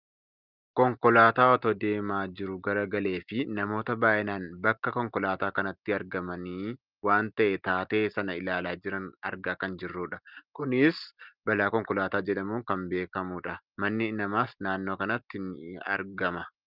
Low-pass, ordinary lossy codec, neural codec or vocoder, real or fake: 5.4 kHz; Opus, 32 kbps; none; real